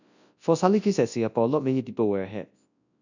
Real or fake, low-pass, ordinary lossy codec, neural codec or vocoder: fake; 7.2 kHz; none; codec, 24 kHz, 0.9 kbps, WavTokenizer, large speech release